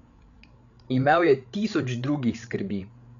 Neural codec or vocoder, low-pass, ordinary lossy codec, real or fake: codec, 16 kHz, 8 kbps, FreqCodec, larger model; 7.2 kHz; none; fake